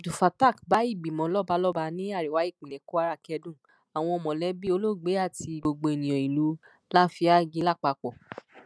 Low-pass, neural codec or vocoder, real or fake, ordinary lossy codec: none; none; real; none